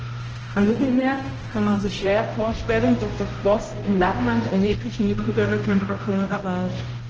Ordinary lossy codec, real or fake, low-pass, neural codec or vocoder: Opus, 16 kbps; fake; 7.2 kHz; codec, 16 kHz, 0.5 kbps, X-Codec, HuBERT features, trained on balanced general audio